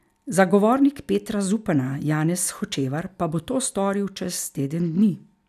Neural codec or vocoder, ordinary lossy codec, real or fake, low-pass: none; none; real; 14.4 kHz